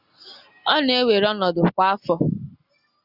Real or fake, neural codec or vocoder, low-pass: real; none; 5.4 kHz